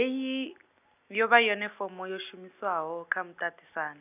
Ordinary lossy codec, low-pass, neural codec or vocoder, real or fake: none; 3.6 kHz; none; real